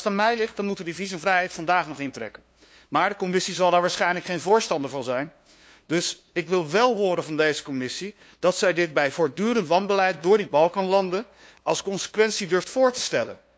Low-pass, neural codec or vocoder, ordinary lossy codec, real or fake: none; codec, 16 kHz, 2 kbps, FunCodec, trained on LibriTTS, 25 frames a second; none; fake